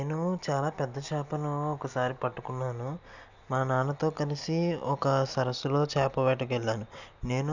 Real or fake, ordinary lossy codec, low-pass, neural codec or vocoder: real; none; 7.2 kHz; none